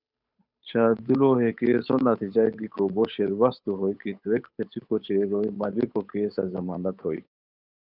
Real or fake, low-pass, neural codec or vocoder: fake; 5.4 kHz; codec, 16 kHz, 8 kbps, FunCodec, trained on Chinese and English, 25 frames a second